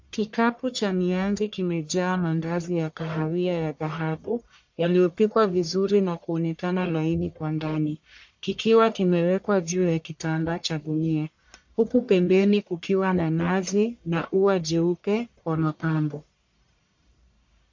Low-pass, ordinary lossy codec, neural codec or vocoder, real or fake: 7.2 kHz; MP3, 48 kbps; codec, 44.1 kHz, 1.7 kbps, Pupu-Codec; fake